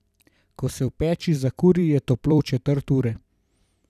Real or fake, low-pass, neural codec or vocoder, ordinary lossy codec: fake; 14.4 kHz; vocoder, 44.1 kHz, 128 mel bands every 256 samples, BigVGAN v2; none